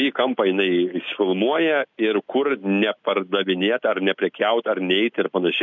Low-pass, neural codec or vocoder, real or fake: 7.2 kHz; none; real